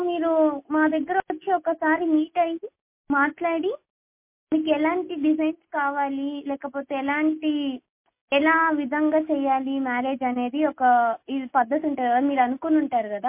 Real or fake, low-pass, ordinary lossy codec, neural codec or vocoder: real; 3.6 kHz; MP3, 24 kbps; none